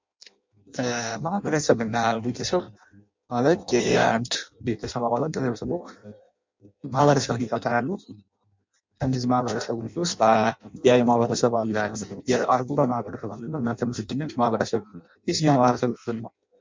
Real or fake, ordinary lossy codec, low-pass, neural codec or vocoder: fake; MP3, 64 kbps; 7.2 kHz; codec, 16 kHz in and 24 kHz out, 0.6 kbps, FireRedTTS-2 codec